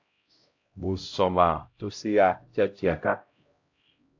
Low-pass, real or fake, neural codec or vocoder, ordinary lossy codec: 7.2 kHz; fake; codec, 16 kHz, 0.5 kbps, X-Codec, HuBERT features, trained on LibriSpeech; AAC, 48 kbps